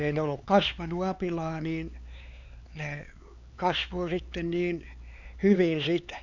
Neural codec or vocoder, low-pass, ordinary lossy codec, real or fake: codec, 16 kHz, 4 kbps, X-Codec, HuBERT features, trained on LibriSpeech; 7.2 kHz; none; fake